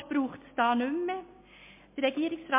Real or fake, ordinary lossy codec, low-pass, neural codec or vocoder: real; MP3, 24 kbps; 3.6 kHz; none